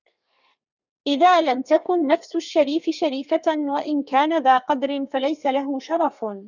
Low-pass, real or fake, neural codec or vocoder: 7.2 kHz; fake; codec, 32 kHz, 1.9 kbps, SNAC